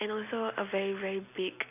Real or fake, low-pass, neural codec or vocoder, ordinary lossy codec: real; 3.6 kHz; none; none